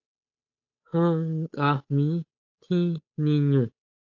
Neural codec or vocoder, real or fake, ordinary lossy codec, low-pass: codec, 16 kHz, 8 kbps, FunCodec, trained on Chinese and English, 25 frames a second; fake; AAC, 48 kbps; 7.2 kHz